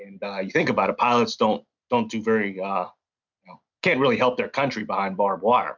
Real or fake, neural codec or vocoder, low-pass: real; none; 7.2 kHz